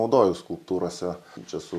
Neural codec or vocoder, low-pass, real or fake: none; 14.4 kHz; real